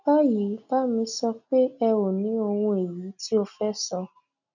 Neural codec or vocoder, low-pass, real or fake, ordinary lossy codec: none; 7.2 kHz; real; none